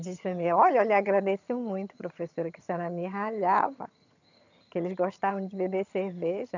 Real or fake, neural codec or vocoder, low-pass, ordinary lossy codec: fake; vocoder, 22.05 kHz, 80 mel bands, HiFi-GAN; 7.2 kHz; none